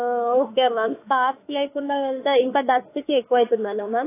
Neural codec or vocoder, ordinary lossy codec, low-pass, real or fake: autoencoder, 48 kHz, 32 numbers a frame, DAC-VAE, trained on Japanese speech; AAC, 24 kbps; 3.6 kHz; fake